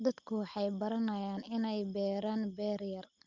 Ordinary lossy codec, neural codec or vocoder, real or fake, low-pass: Opus, 24 kbps; none; real; 7.2 kHz